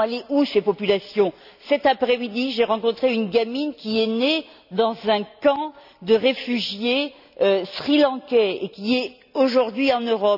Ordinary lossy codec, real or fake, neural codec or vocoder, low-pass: none; real; none; 5.4 kHz